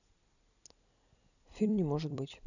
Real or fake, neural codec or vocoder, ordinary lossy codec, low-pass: fake; vocoder, 44.1 kHz, 128 mel bands every 256 samples, BigVGAN v2; none; 7.2 kHz